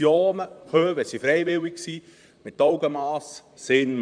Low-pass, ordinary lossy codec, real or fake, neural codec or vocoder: 9.9 kHz; none; fake; vocoder, 44.1 kHz, 128 mel bands, Pupu-Vocoder